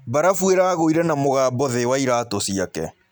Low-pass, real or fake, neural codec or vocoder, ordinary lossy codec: none; real; none; none